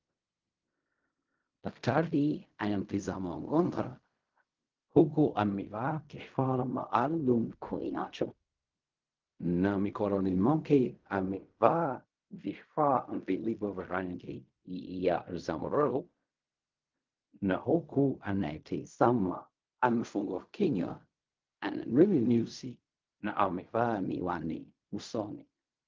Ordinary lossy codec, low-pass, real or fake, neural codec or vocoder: Opus, 24 kbps; 7.2 kHz; fake; codec, 16 kHz in and 24 kHz out, 0.4 kbps, LongCat-Audio-Codec, fine tuned four codebook decoder